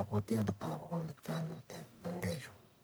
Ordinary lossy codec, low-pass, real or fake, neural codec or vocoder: none; none; fake; codec, 44.1 kHz, 1.7 kbps, Pupu-Codec